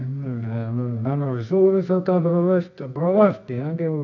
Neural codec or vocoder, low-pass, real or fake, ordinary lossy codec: codec, 24 kHz, 0.9 kbps, WavTokenizer, medium music audio release; 7.2 kHz; fake; none